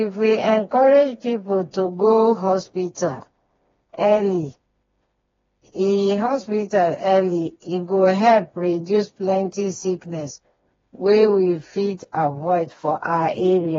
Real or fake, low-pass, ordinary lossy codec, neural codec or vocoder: fake; 7.2 kHz; AAC, 24 kbps; codec, 16 kHz, 2 kbps, FreqCodec, smaller model